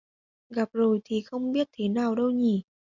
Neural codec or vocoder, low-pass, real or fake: none; 7.2 kHz; real